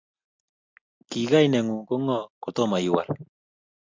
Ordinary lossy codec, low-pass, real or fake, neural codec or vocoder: MP3, 48 kbps; 7.2 kHz; real; none